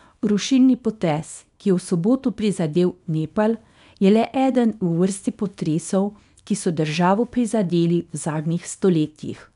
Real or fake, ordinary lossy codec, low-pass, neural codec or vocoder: fake; none; 10.8 kHz; codec, 24 kHz, 0.9 kbps, WavTokenizer, small release